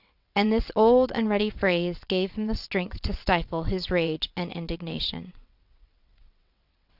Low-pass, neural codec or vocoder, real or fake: 5.4 kHz; vocoder, 22.05 kHz, 80 mel bands, WaveNeXt; fake